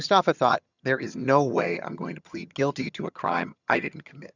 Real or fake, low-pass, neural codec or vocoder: fake; 7.2 kHz; vocoder, 22.05 kHz, 80 mel bands, HiFi-GAN